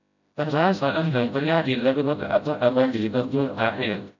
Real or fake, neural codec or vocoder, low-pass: fake; codec, 16 kHz, 0.5 kbps, FreqCodec, smaller model; 7.2 kHz